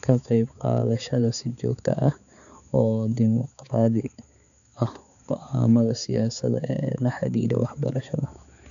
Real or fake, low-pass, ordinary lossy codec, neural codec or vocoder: fake; 7.2 kHz; none; codec, 16 kHz, 4 kbps, X-Codec, HuBERT features, trained on balanced general audio